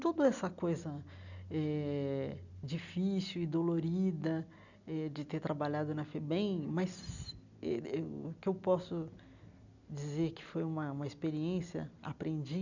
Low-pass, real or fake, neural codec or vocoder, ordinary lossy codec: 7.2 kHz; real; none; none